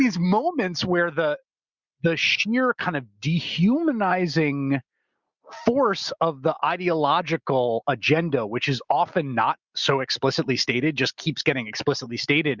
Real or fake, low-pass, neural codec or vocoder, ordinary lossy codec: real; 7.2 kHz; none; Opus, 64 kbps